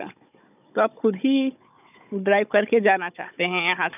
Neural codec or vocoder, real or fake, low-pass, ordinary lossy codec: codec, 16 kHz, 16 kbps, FunCodec, trained on Chinese and English, 50 frames a second; fake; 3.6 kHz; none